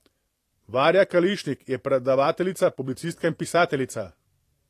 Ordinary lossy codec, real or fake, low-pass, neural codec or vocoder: AAC, 48 kbps; fake; 14.4 kHz; vocoder, 44.1 kHz, 128 mel bands every 512 samples, BigVGAN v2